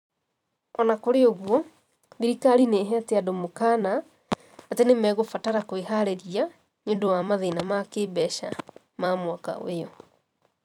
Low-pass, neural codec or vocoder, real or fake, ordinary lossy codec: 19.8 kHz; vocoder, 44.1 kHz, 128 mel bands every 256 samples, BigVGAN v2; fake; none